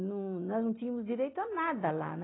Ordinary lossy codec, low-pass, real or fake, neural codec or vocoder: AAC, 16 kbps; 7.2 kHz; real; none